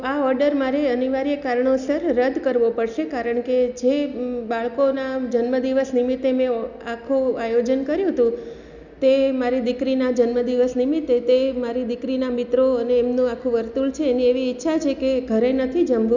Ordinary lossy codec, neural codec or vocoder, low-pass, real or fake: none; none; 7.2 kHz; real